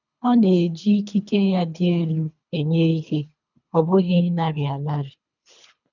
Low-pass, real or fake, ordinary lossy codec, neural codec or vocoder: 7.2 kHz; fake; none; codec, 24 kHz, 3 kbps, HILCodec